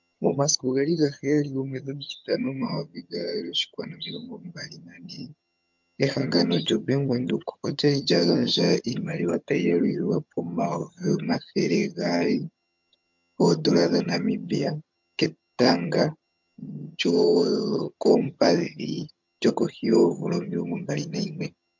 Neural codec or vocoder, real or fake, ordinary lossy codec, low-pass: vocoder, 22.05 kHz, 80 mel bands, HiFi-GAN; fake; MP3, 64 kbps; 7.2 kHz